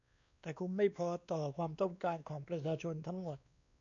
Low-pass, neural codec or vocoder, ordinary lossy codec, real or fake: 7.2 kHz; codec, 16 kHz, 2 kbps, X-Codec, WavLM features, trained on Multilingual LibriSpeech; MP3, 96 kbps; fake